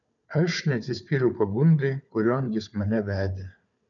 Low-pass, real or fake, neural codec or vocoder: 7.2 kHz; fake; codec, 16 kHz, 4 kbps, FunCodec, trained on Chinese and English, 50 frames a second